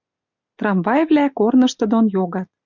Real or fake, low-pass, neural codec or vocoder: real; 7.2 kHz; none